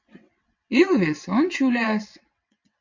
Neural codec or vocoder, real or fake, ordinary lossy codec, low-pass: vocoder, 22.05 kHz, 80 mel bands, WaveNeXt; fake; MP3, 48 kbps; 7.2 kHz